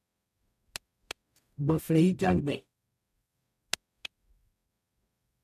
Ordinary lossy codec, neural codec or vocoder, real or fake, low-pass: MP3, 96 kbps; codec, 44.1 kHz, 0.9 kbps, DAC; fake; 14.4 kHz